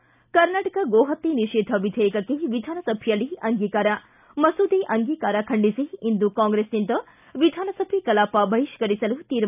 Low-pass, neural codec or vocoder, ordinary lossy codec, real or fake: 3.6 kHz; none; none; real